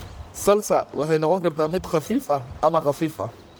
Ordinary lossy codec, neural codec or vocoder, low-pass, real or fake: none; codec, 44.1 kHz, 1.7 kbps, Pupu-Codec; none; fake